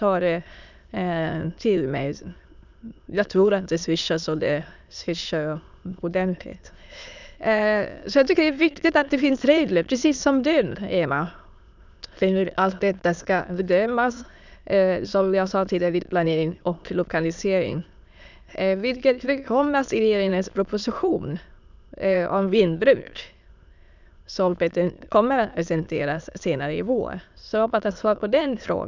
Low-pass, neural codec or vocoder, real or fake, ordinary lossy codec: 7.2 kHz; autoencoder, 22.05 kHz, a latent of 192 numbers a frame, VITS, trained on many speakers; fake; none